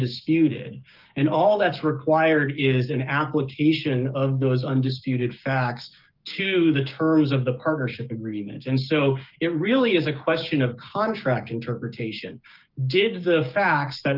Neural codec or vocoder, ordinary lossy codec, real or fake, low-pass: codec, 16 kHz, 6 kbps, DAC; Opus, 24 kbps; fake; 5.4 kHz